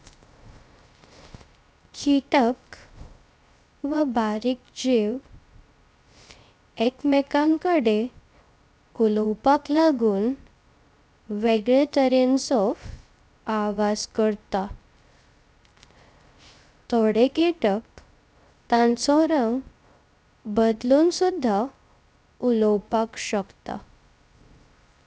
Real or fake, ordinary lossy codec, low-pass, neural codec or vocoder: fake; none; none; codec, 16 kHz, 0.3 kbps, FocalCodec